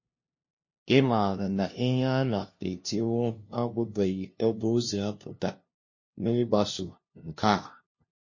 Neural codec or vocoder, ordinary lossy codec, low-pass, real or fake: codec, 16 kHz, 0.5 kbps, FunCodec, trained on LibriTTS, 25 frames a second; MP3, 32 kbps; 7.2 kHz; fake